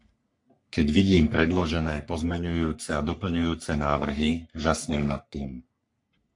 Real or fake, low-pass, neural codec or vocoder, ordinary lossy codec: fake; 10.8 kHz; codec, 44.1 kHz, 3.4 kbps, Pupu-Codec; AAC, 64 kbps